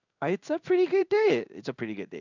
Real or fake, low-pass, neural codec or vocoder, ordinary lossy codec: fake; 7.2 kHz; codec, 16 kHz in and 24 kHz out, 1 kbps, XY-Tokenizer; none